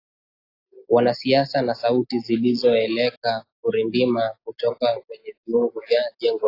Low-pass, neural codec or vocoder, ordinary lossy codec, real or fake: 5.4 kHz; none; AAC, 32 kbps; real